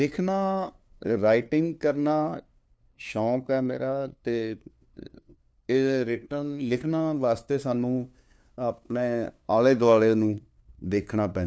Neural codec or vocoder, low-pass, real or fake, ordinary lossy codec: codec, 16 kHz, 2 kbps, FunCodec, trained on LibriTTS, 25 frames a second; none; fake; none